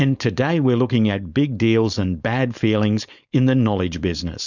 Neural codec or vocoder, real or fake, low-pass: codec, 16 kHz, 4.8 kbps, FACodec; fake; 7.2 kHz